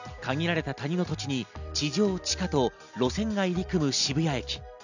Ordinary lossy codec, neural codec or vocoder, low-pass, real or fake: none; none; 7.2 kHz; real